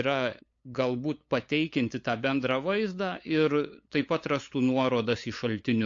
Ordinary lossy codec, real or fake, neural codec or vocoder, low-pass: AAC, 48 kbps; fake; codec, 16 kHz, 8 kbps, FunCodec, trained on LibriTTS, 25 frames a second; 7.2 kHz